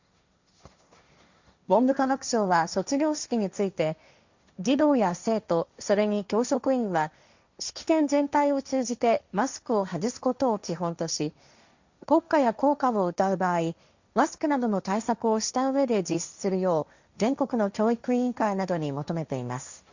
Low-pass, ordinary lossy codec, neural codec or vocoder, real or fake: 7.2 kHz; Opus, 64 kbps; codec, 16 kHz, 1.1 kbps, Voila-Tokenizer; fake